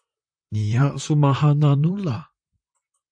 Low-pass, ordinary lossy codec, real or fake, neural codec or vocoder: 9.9 kHz; AAC, 64 kbps; fake; vocoder, 44.1 kHz, 128 mel bands, Pupu-Vocoder